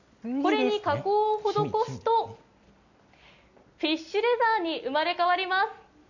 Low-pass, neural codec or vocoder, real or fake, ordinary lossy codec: 7.2 kHz; none; real; none